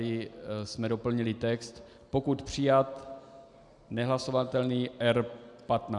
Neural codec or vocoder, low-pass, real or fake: none; 10.8 kHz; real